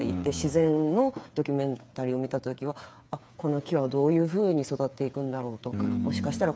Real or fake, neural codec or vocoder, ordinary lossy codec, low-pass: fake; codec, 16 kHz, 8 kbps, FreqCodec, smaller model; none; none